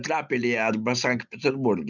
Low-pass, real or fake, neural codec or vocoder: 7.2 kHz; real; none